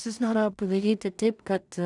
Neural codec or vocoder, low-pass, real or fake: codec, 16 kHz in and 24 kHz out, 0.4 kbps, LongCat-Audio-Codec, two codebook decoder; 10.8 kHz; fake